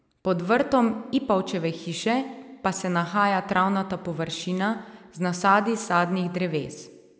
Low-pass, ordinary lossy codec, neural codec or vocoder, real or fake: none; none; none; real